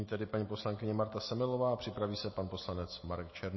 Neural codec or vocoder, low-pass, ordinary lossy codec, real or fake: none; 7.2 kHz; MP3, 24 kbps; real